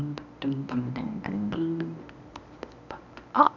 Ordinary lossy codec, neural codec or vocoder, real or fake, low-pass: none; codec, 24 kHz, 0.9 kbps, WavTokenizer, medium speech release version 1; fake; 7.2 kHz